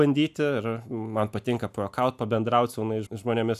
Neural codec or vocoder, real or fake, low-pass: none; real; 14.4 kHz